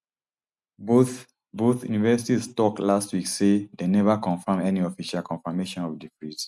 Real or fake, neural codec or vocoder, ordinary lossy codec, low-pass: real; none; none; none